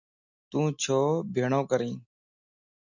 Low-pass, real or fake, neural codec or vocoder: 7.2 kHz; real; none